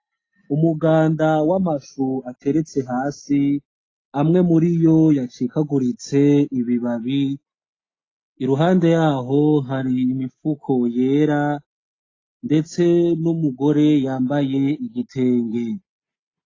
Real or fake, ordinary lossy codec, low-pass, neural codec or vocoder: real; AAC, 32 kbps; 7.2 kHz; none